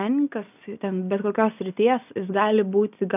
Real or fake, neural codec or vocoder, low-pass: real; none; 3.6 kHz